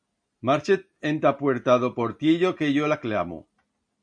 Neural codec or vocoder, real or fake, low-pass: none; real; 9.9 kHz